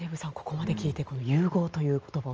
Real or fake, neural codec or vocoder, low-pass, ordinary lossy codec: real; none; 7.2 kHz; Opus, 24 kbps